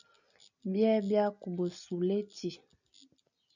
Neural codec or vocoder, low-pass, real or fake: none; 7.2 kHz; real